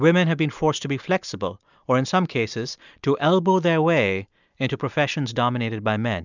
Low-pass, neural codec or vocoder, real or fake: 7.2 kHz; none; real